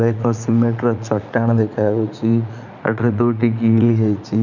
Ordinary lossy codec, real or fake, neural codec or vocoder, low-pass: none; fake; vocoder, 44.1 kHz, 80 mel bands, Vocos; 7.2 kHz